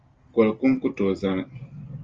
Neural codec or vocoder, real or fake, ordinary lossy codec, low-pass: none; real; Opus, 32 kbps; 7.2 kHz